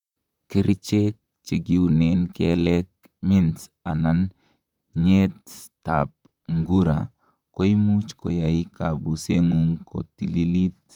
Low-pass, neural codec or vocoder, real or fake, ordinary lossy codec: 19.8 kHz; vocoder, 44.1 kHz, 128 mel bands, Pupu-Vocoder; fake; none